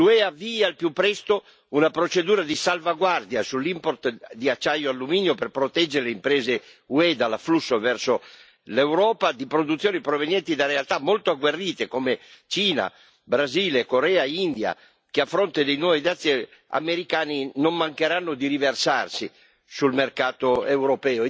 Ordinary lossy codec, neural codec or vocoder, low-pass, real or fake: none; none; none; real